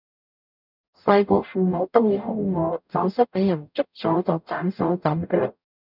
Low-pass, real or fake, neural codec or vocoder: 5.4 kHz; fake; codec, 44.1 kHz, 0.9 kbps, DAC